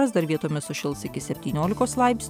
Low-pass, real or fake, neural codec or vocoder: 19.8 kHz; real; none